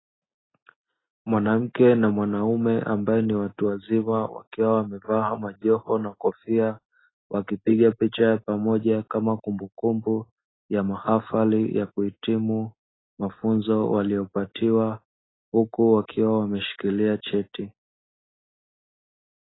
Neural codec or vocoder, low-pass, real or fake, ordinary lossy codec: none; 7.2 kHz; real; AAC, 16 kbps